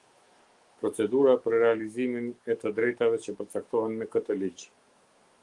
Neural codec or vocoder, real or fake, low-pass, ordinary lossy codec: autoencoder, 48 kHz, 128 numbers a frame, DAC-VAE, trained on Japanese speech; fake; 10.8 kHz; Opus, 24 kbps